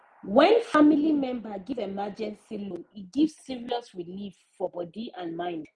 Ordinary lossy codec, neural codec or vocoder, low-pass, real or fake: Opus, 24 kbps; none; 10.8 kHz; real